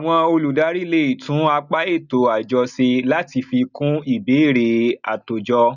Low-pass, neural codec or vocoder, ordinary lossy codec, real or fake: 7.2 kHz; none; none; real